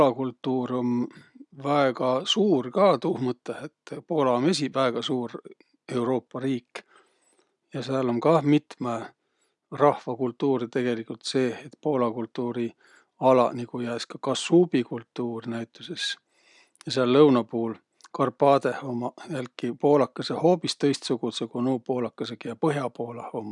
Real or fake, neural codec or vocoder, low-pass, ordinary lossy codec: real; none; 10.8 kHz; none